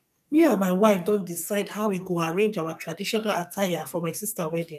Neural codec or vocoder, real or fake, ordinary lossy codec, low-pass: codec, 44.1 kHz, 2.6 kbps, SNAC; fake; none; 14.4 kHz